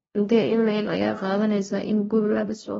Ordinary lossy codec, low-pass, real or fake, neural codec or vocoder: AAC, 24 kbps; 7.2 kHz; fake; codec, 16 kHz, 0.5 kbps, FunCodec, trained on LibriTTS, 25 frames a second